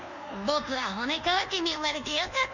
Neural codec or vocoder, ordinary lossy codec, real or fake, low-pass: codec, 24 kHz, 1.2 kbps, DualCodec; none; fake; 7.2 kHz